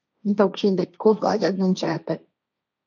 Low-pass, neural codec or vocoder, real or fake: 7.2 kHz; codec, 16 kHz, 1.1 kbps, Voila-Tokenizer; fake